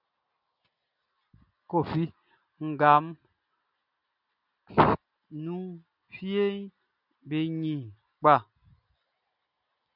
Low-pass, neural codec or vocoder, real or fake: 5.4 kHz; none; real